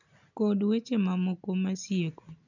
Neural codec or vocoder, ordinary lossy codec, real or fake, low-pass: none; none; real; 7.2 kHz